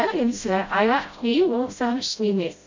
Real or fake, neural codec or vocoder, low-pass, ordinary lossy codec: fake; codec, 16 kHz, 0.5 kbps, FreqCodec, smaller model; 7.2 kHz; MP3, 64 kbps